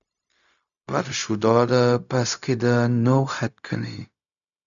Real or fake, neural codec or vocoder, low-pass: fake; codec, 16 kHz, 0.4 kbps, LongCat-Audio-Codec; 7.2 kHz